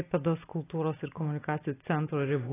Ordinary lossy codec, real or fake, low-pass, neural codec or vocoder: AAC, 16 kbps; real; 3.6 kHz; none